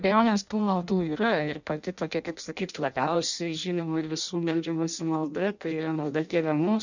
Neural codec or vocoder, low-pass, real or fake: codec, 16 kHz in and 24 kHz out, 0.6 kbps, FireRedTTS-2 codec; 7.2 kHz; fake